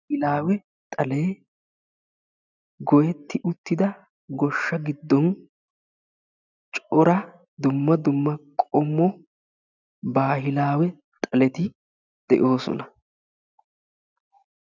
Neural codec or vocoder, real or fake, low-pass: none; real; 7.2 kHz